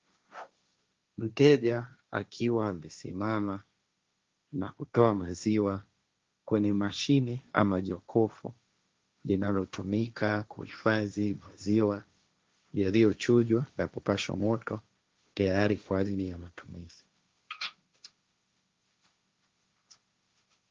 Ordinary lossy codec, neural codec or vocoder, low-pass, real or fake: Opus, 32 kbps; codec, 16 kHz, 1.1 kbps, Voila-Tokenizer; 7.2 kHz; fake